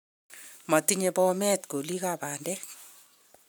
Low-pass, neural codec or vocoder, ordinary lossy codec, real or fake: none; vocoder, 44.1 kHz, 128 mel bands every 512 samples, BigVGAN v2; none; fake